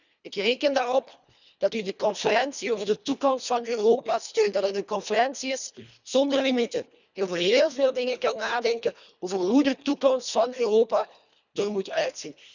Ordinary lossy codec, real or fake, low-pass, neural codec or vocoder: none; fake; 7.2 kHz; codec, 24 kHz, 1.5 kbps, HILCodec